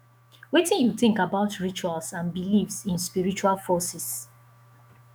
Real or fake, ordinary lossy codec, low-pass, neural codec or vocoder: fake; none; none; autoencoder, 48 kHz, 128 numbers a frame, DAC-VAE, trained on Japanese speech